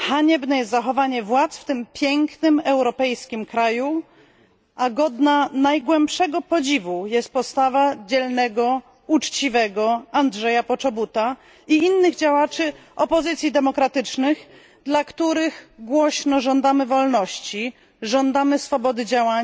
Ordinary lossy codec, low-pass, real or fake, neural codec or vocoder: none; none; real; none